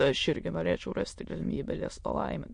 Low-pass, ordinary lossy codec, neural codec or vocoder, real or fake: 9.9 kHz; MP3, 48 kbps; autoencoder, 22.05 kHz, a latent of 192 numbers a frame, VITS, trained on many speakers; fake